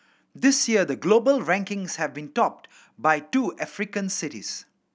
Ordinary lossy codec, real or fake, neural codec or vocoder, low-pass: none; real; none; none